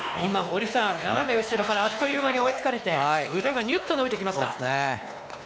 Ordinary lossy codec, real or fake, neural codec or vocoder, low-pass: none; fake; codec, 16 kHz, 2 kbps, X-Codec, WavLM features, trained on Multilingual LibriSpeech; none